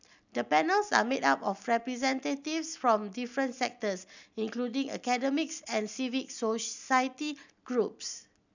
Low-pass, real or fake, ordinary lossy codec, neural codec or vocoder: 7.2 kHz; real; none; none